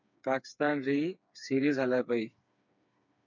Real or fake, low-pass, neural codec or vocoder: fake; 7.2 kHz; codec, 16 kHz, 4 kbps, FreqCodec, smaller model